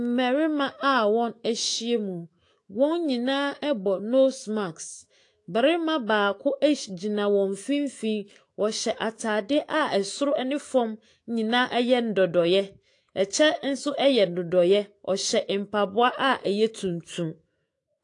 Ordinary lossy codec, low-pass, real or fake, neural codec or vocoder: AAC, 48 kbps; 10.8 kHz; fake; autoencoder, 48 kHz, 128 numbers a frame, DAC-VAE, trained on Japanese speech